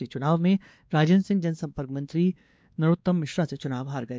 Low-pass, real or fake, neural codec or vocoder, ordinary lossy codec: none; fake; codec, 16 kHz, 2 kbps, X-Codec, WavLM features, trained on Multilingual LibriSpeech; none